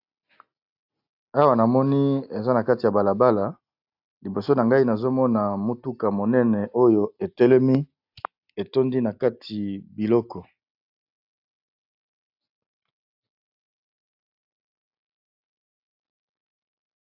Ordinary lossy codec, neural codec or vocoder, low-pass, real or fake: AAC, 48 kbps; none; 5.4 kHz; real